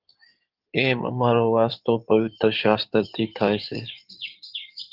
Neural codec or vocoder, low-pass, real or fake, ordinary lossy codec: codec, 16 kHz in and 24 kHz out, 2.2 kbps, FireRedTTS-2 codec; 5.4 kHz; fake; Opus, 32 kbps